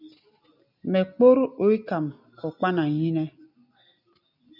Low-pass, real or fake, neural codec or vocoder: 5.4 kHz; real; none